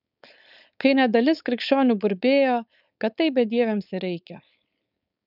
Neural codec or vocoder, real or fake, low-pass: codec, 16 kHz, 4.8 kbps, FACodec; fake; 5.4 kHz